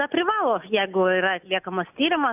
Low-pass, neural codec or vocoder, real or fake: 3.6 kHz; none; real